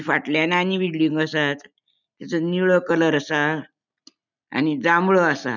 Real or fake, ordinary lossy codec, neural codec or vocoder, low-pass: real; none; none; 7.2 kHz